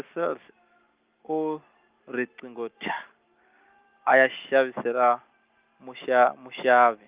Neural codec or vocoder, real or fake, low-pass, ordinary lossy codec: none; real; 3.6 kHz; Opus, 32 kbps